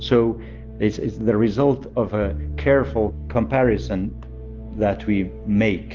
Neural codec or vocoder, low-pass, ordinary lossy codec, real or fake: none; 7.2 kHz; Opus, 32 kbps; real